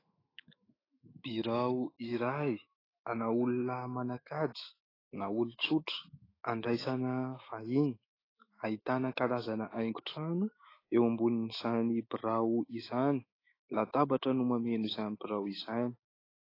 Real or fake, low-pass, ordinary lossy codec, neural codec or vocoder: fake; 5.4 kHz; AAC, 24 kbps; autoencoder, 48 kHz, 128 numbers a frame, DAC-VAE, trained on Japanese speech